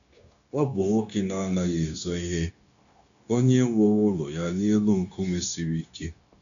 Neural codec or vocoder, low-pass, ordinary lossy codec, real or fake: codec, 16 kHz, 0.9 kbps, LongCat-Audio-Codec; 7.2 kHz; none; fake